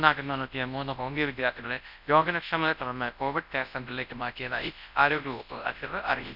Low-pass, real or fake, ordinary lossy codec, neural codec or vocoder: 5.4 kHz; fake; none; codec, 24 kHz, 0.9 kbps, WavTokenizer, large speech release